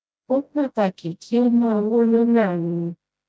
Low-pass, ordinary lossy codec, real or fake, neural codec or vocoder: none; none; fake; codec, 16 kHz, 0.5 kbps, FreqCodec, smaller model